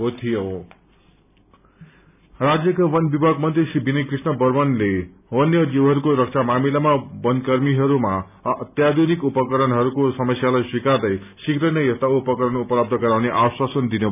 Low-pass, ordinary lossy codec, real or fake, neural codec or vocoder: 3.6 kHz; none; real; none